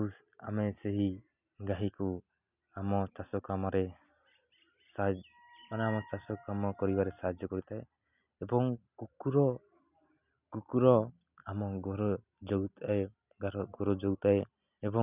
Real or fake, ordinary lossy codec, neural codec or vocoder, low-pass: real; none; none; 3.6 kHz